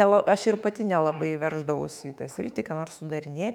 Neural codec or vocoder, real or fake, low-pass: autoencoder, 48 kHz, 32 numbers a frame, DAC-VAE, trained on Japanese speech; fake; 19.8 kHz